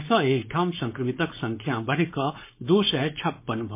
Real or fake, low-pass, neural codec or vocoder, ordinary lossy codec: fake; 3.6 kHz; codec, 16 kHz, 4.8 kbps, FACodec; MP3, 24 kbps